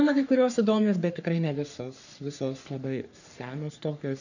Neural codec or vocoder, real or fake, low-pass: codec, 44.1 kHz, 3.4 kbps, Pupu-Codec; fake; 7.2 kHz